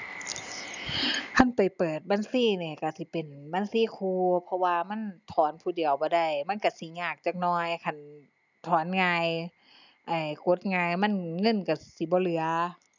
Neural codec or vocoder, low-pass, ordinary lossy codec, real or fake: none; 7.2 kHz; none; real